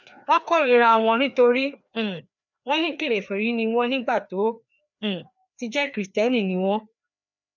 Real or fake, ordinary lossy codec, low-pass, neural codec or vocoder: fake; none; 7.2 kHz; codec, 16 kHz, 2 kbps, FreqCodec, larger model